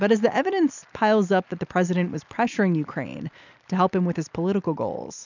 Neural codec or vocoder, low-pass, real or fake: none; 7.2 kHz; real